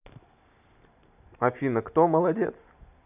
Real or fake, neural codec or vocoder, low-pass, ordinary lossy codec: real; none; 3.6 kHz; none